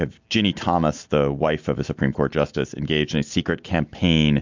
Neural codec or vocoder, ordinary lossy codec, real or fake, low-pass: none; MP3, 64 kbps; real; 7.2 kHz